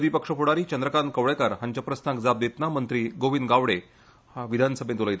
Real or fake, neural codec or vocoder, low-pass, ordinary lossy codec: real; none; none; none